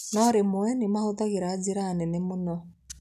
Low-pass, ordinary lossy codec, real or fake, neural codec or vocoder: 14.4 kHz; none; real; none